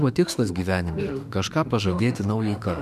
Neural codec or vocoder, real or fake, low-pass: autoencoder, 48 kHz, 32 numbers a frame, DAC-VAE, trained on Japanese speech; fake; 14.4 kHz